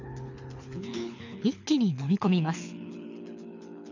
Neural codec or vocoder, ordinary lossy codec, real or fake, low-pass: codec, 24 kHz, 6 kbps, HILCodec; none; fake; 7.2 kHz